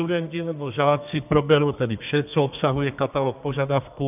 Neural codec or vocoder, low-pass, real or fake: codec, 32 kHz, 1.9 kbps, SNAC; 3.6 kHz; fake